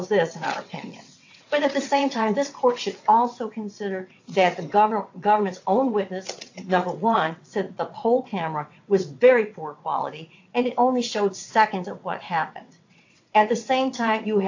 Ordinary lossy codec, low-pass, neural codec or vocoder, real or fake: AAC, 48 kbps; 7.2 kHz; vocoder, 22.05 kHz, 80 mel bands, WaveNeXt; fake